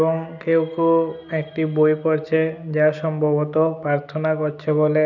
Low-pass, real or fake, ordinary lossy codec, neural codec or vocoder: 7.2 kHz; real; none; none